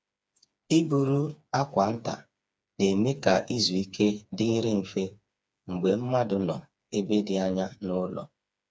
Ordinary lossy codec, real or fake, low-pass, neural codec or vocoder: none; fake; none; codec, 16 kHz, 4 kbps, FreqCodec, smaller model